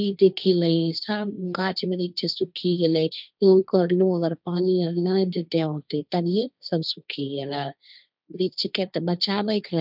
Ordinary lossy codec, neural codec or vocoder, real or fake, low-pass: none; codec, 16 kHz, 1.1 kbps, Voila-Tokenizer; fake; 5.4 kHz